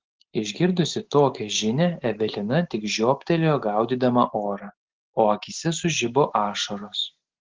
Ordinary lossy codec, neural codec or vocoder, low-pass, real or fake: Opus, 16 kbps; none; 7.2 kHz; real